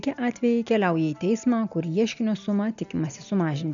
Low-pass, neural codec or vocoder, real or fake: 7.2 kHz; none; real